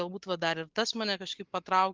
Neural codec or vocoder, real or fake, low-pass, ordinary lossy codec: none; real; 7.2 kHz; Opus, 24 kbps